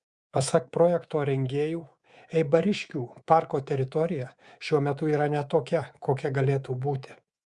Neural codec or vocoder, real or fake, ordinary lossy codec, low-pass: codec, 24 kHz, 3.1 kbps, DualCodec; fake; Opus, 64 kbps; 10.8 kHz